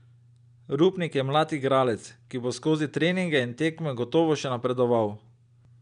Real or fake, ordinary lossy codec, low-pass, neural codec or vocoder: real; none; 9.9 kHz; none